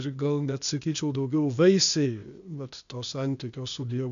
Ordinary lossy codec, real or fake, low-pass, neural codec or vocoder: MP3, 96 kbps; fake; 7.2 kHz; codec, 16 kHz, 0.8 kbps, ZipCodec